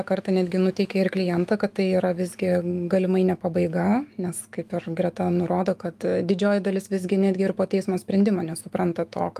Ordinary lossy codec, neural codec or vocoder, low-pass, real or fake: Opus, 32 kbps; autoencoder, 48 kHz, 128 numbers a frame, DAC-VAE, trained on Japanese speech; 14.4 kHz; fake